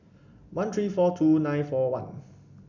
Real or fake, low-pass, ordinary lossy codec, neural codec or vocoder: real; 7.2 kHz; none; none